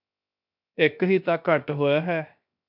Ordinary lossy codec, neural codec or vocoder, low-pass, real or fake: MP3, 48 kbps; codec, 16 kHz, 0.7 kbps, FocalCodec; 5.4 kHz; fake